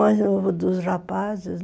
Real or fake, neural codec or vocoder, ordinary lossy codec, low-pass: real; none; none; none